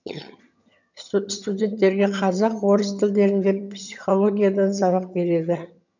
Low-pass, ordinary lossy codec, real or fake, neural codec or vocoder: 7.2 kHz; none; fake; vocoder, 22.05 kHz, 80 mel bands, HiFi-GAN